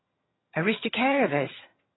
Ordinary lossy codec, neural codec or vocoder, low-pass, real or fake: AAC, 16 kbps; vocoder, 22.05 kHz, 80 mel bands, HiFi-GAN; 7.2 kHz; fake